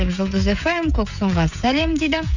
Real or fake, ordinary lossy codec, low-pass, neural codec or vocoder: fake; none; 7.2 kHz; codec, 16 kHz, 16 kbps, FreqCodec, smaller model